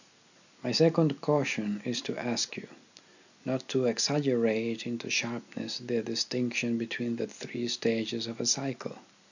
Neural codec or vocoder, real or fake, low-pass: none; real; 7.2 kHz